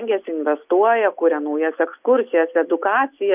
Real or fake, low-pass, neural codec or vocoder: real; 3.6 kHz; none